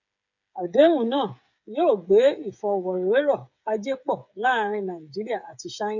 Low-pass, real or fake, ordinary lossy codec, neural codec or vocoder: 7.2 kHz; fake; none; codec, 16 kHz, 8 kbps, FreqCodec, smaller model